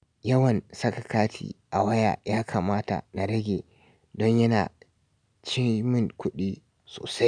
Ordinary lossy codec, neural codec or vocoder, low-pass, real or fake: none; none; 9.9 kHz; real